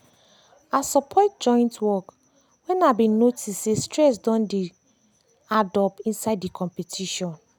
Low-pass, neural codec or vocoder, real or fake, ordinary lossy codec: none; none; real; none